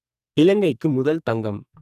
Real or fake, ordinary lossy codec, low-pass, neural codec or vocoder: fake; none; 14.4 kHz; codec, 44.1 kHz, 2.6 kbps, SNAC